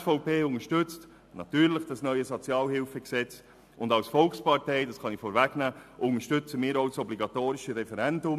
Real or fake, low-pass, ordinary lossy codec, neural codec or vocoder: real; 14.4 kHz; AAC, 96 kbps; none